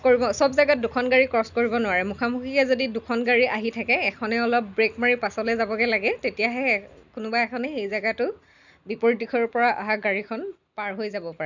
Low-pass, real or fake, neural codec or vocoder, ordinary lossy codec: 7.2 kHz; real; none; none